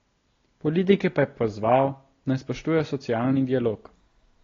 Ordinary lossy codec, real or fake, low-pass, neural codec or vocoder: AAC, 32 kbps; real; 7.2 kHz; none